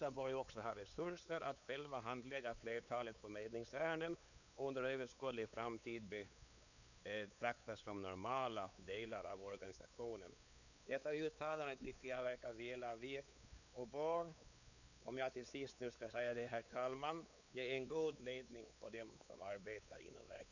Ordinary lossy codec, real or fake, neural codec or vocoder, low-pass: none; fake; codec, 16 kHz, 4 kbps, X-Codec, WavLM features, trained on Multilingual LibriSpeech; 7.2 kHz